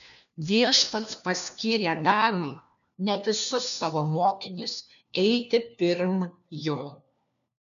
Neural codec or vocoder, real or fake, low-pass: codec, 16 kHz, 1 kbps, FunCodec, trained on LibriTTS, 50 frames a second; fake; 7.2 kHz